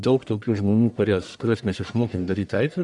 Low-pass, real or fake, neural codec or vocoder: 10.8 kHz; fake; codec, 44.1 kHz, 1.7 kbps, Pupu-Codec